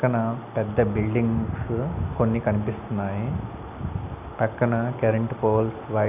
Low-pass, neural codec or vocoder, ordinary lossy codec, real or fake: 3.6 kHz; none; none; real